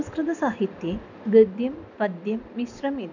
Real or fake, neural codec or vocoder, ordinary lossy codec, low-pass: real; none; none; 7.2 kHz